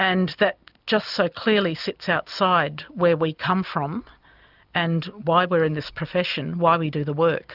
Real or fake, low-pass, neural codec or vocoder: real; 5.4 kHz; none